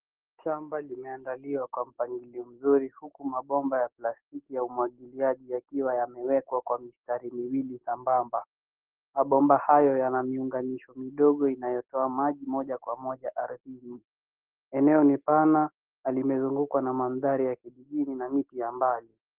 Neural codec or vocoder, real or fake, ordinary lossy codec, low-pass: none; real; Opus, 16 kbps; 3.6 kHz